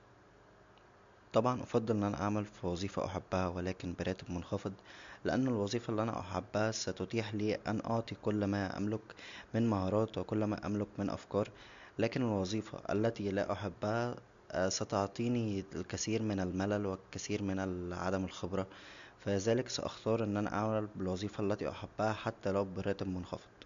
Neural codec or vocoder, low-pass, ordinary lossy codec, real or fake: none; 7.2 kHz; MP3, 64 kbps; real